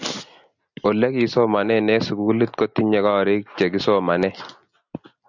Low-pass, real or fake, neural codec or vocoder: 7.2 kHz; real; none